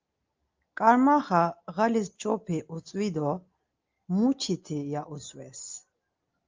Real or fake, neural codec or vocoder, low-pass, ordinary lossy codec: real; none; 7.2 kHz; Opus, 24 kbps